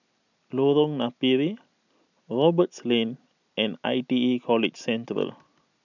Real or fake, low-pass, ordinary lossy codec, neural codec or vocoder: real; 7.2 kHz; none; none